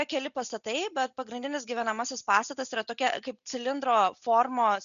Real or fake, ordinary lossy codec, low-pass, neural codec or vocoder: real; MP3, 96 kbps; 7.2 kHz; none